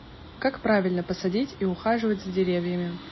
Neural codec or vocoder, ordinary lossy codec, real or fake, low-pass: none; MP3, 24 kbps; real; 7.2 kHz